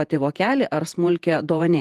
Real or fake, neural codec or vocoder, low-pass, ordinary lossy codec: fake; vocoder, 48 kHz, 128 mel bands, Vocos; 14.4 kHz; Opus, 24 kbps